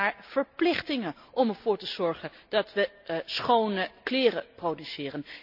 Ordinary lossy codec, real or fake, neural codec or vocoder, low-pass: none; real; none; 5.4 kHz